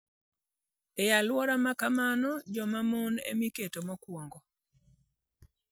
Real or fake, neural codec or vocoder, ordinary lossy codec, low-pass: real; none; none; none